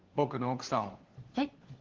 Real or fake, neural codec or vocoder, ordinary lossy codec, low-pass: fake; codec, 16 kHz, 4 kbps, FunCodec, trained on LibriTTS, 50 frames a second; Opus, 16 kbps; 7.2 kHz